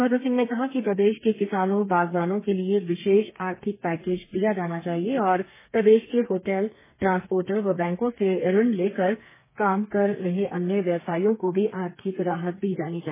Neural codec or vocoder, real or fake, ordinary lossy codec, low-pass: codec, 32 kHz, 1.9 kbps, SNAC; fake; MP3, 16 kbps; 3.6 kHz